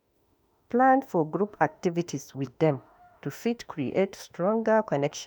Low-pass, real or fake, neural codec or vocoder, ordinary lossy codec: none; fake; autoencoder, 48 kHz, 32 numbers a frame, DAC-VAE, trained on Japanese speech; none